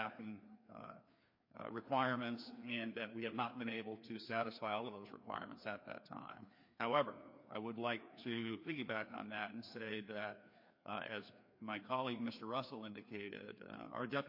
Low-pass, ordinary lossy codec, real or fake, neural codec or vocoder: 5.4 kHz; MP3, 32 kbps; fake; codec, 16 kHz, 2 kbps, FreqCodec, larger model